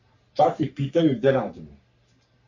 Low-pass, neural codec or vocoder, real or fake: 7.2 kHz; codec, 44.1 kHz, 3.4 kbps, Pupu-Codec; fake